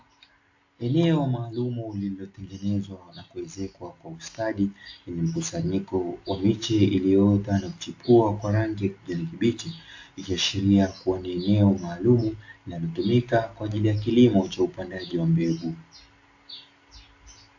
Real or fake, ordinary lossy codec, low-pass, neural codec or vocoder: real; AAC, 48 kbps; 7.2 kHz; none